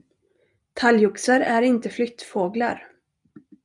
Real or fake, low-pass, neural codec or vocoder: real; 10.8 kHz; none